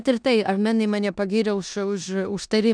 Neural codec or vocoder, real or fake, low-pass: codec, 16 kHz in and 24 kHz out, 0.9 kbps, LongCat-Audio-Codec, four codebook decoder; fake; 9.9 kHz